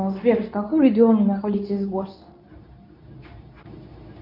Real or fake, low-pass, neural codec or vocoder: fake; 5.4 kHz; codec, 24 kHz, 0.9 kbps, WavTokenizer, medium speech release version 2